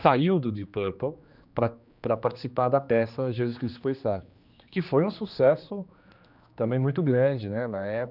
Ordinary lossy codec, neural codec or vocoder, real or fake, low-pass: none; codec, 16 kHz, 2 kbps, X-Codec, HuBERT features, trained on general audio; fake; 5.4 kHz